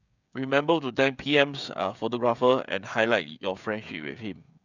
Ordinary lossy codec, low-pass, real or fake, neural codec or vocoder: none; 7.2 kHz; fake; codec, 16 kHz, 16 kbps, FreqCodec, smaller model